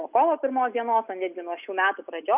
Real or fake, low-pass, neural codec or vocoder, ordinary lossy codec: real; 3.6 kHz; none; AAC, 32 kbps